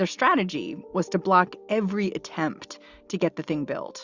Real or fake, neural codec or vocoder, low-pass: real; none; 7.2 kHz